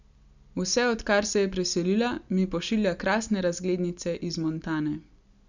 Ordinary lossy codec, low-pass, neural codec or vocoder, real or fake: none; 7.2 kHz; none; real